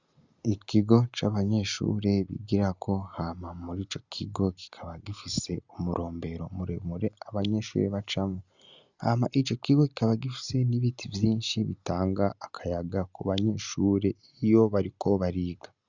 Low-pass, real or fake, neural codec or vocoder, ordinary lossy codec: 7.2 kHz; real; none; Opus, 64 kbps